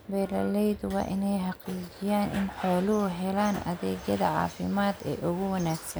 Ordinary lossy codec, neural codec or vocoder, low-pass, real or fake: none; none; none; real